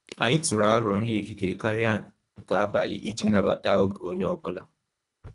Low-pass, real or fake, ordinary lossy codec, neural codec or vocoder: 10.8 kHz; fake; none; codec, 24 kHz, 1.5 kbps, HILCodec